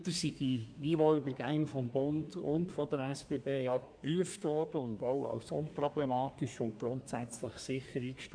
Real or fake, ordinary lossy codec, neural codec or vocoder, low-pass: fake; MP3, 96 kbps; codec, 24 kHz, 1 kbps, SNAC; 9.9 kHz